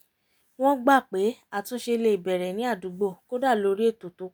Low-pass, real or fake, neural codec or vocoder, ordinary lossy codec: none; real; none; none